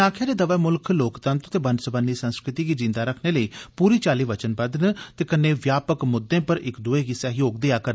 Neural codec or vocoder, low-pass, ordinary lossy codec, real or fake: none; none; none; real